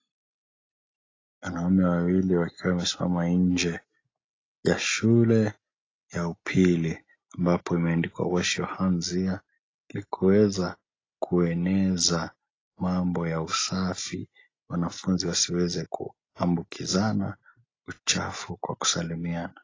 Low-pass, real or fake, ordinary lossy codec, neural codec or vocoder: 7.2 kHz; real; AAC, 32 kbps; none